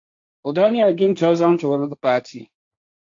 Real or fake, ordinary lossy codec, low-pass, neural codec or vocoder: fake; AAC, 48 kbps; 7.2 kHz; codec, 16 kHz, 1.1 kbps, Voila-Tokenizer